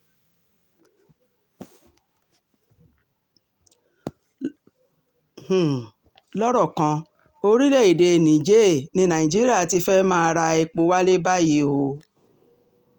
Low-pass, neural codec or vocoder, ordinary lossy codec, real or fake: 19.8 kHz; none; Opus, 32 kbps; real